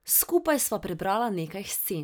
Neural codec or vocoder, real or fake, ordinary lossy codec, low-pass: none; real; none; none